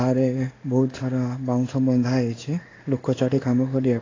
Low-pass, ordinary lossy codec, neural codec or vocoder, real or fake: 7.2 kHz; AAC, 32 kbps; codec, 16 kHz in and 24 kHz out, 1 kbps, XY-Tokenizer; fake